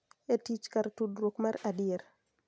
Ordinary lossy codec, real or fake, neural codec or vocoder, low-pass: none; real; none; none